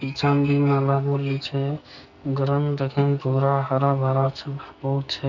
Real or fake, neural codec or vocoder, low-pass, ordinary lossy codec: fake; codec, 44.1 kHz, 2.6 kbps, SNAC; 7.2 kHz; none